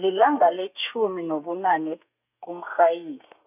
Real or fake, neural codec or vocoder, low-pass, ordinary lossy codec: fake; codec, 32 kHz, 1.9 kbps, SNAC; 3.6 kHz; none